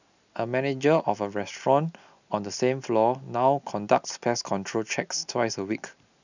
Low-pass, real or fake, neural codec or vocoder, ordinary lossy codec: 7.2 kHz; real; none; none